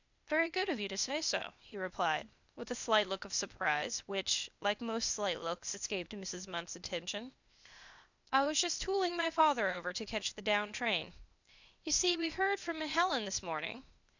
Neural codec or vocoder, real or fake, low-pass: codec, 16 kHz, 0.8 kbps, ZipCodec; fake; 7.2 kHz